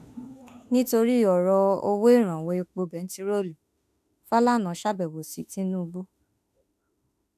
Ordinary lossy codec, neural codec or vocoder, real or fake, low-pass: none; autoencoder, 48 kHz, 32 numbers a frame, DAC-VAE, trained on Japanese speech; fake; 14.4 kHz